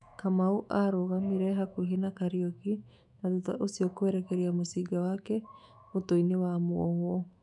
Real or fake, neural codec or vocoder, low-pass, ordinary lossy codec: fake; autoencoder, 48 kHz, 128 numbers a frame, DAC-VAE, trained on Japanese speech; 10.8 kHz; none